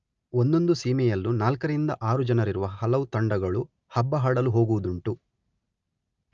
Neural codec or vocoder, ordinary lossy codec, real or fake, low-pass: none; Opus, 24 kbps; real; 7.2 kHz